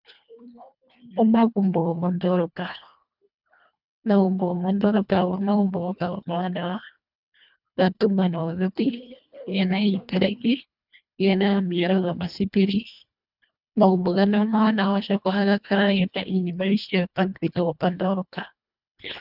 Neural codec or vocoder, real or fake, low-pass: codec, 24 kHz, 1.5 kbps, HILCodec; fake; 5.4 kHz